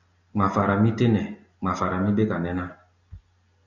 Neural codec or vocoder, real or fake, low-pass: none; real; 7.2 kHz